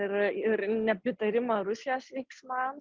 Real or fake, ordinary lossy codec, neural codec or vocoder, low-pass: real; Opus, 32 kbps; none; 7.2 kHz